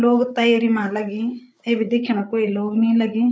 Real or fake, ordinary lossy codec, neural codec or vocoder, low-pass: fake; none; codec, 16 kHz, 8 kbps, FreqCodec, larger model; none